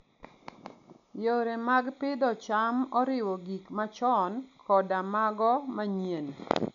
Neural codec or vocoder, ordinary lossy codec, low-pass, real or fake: none; none; 7.2 kHz; real